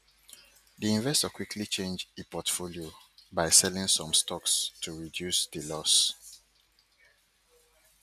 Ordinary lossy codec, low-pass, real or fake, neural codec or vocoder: none; 14.4 kHz; real; none